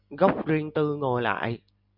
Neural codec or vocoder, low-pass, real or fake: none; 5.4 kHz; real